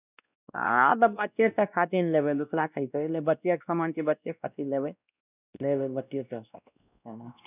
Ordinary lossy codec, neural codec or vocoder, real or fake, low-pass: none; codec, 16 kHz, 1 kbps, X-Codec, WavLM features, trained on Multilingual LibriSpeech; fake; 3.6 kHz